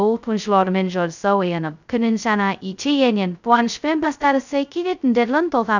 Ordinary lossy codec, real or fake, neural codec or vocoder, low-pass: none; fake; codec, 16 kHz, 0.2 kbps, FocalCodec; 7.2 kHz